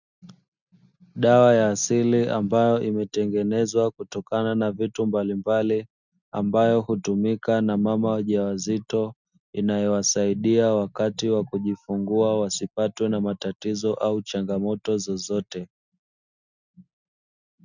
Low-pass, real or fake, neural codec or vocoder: 7.2 kHz; real; none